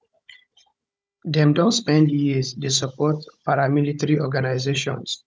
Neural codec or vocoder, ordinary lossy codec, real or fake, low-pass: codec, 16 kHz, 16 kbps, FunCodec, trained on Chinese and English, 50 frames a second; none; fake; none